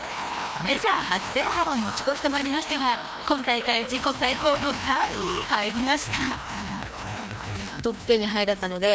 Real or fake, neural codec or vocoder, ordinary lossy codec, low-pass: fake; codec, 16 kHz, 1 kbps, FreqCodec, larger model; none; none